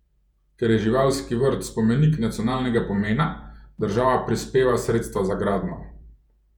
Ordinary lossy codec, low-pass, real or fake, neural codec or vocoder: none; 19.8 kHz; fake; vocoder, 48 kHz, 128 mel bands, Vocos